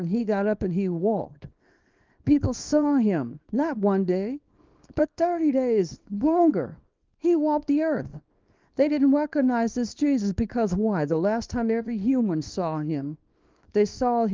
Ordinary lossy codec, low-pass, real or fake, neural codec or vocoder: Opus, 24 kbps; 7.2 kHz; fake; codec, 24 kHz, 0.9 kbps, WavTokenizer, small release